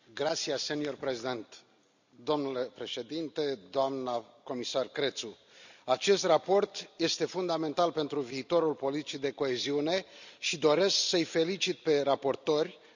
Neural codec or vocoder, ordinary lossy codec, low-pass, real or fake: none; none; 7.2 kHz; real